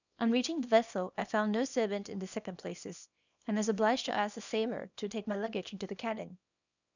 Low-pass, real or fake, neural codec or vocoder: 7.2 kHz; fake; codec, 24 kHz, 0.9 kbps, WavTokenizer, small release